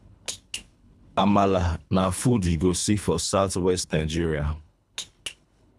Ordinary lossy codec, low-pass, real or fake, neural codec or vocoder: none; none; fake; codec, 24 kHz, 3 kbps, HILCodec